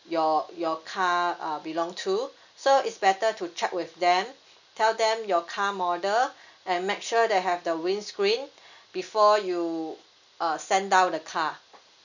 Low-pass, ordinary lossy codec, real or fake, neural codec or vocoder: 7.2 kHz; none; real; none